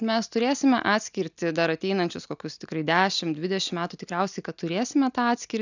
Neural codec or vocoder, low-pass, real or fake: none; 7.2 kHz; real